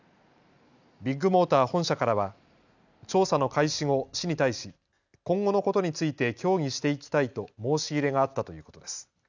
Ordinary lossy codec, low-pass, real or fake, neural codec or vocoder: none; 7.2 kHz; real; none